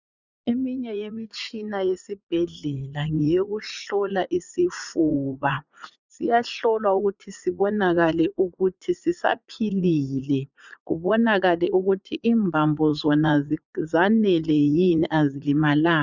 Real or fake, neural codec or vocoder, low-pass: fake; vocoder, 44.1 kHz, 80 mel bands, Vocos; 7.2 kHz